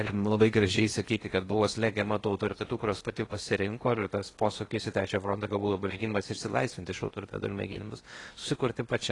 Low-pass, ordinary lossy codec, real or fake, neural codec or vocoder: 10.8 kHz; AAC, 32 kbps; fake; codec, 16 kHz in and 24 kHz out, 0.8 kbps, FocalCodec, streaming, 65536 codes